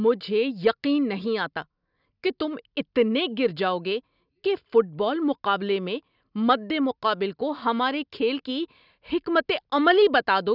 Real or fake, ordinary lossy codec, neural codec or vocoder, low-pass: real; none; none; 5.4 kHz